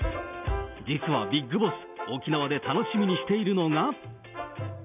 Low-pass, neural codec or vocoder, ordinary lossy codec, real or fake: 3.6 kHz; none; none; real